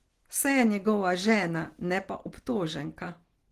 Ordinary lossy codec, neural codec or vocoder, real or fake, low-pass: Opus, 16 kbps; none; real; 14.4 kHz